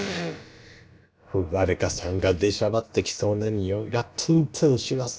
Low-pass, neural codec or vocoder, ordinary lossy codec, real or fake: none; codec, 16 kHz, about 1 kbps, DyCAST, with the encoder's durations; none; fake